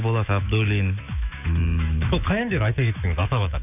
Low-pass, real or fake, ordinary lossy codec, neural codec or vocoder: 3.6 kHz; fake; none; autoencoder, 48 kHz, 128 numbers a frame, DAC-VAE, trained on Japanese speech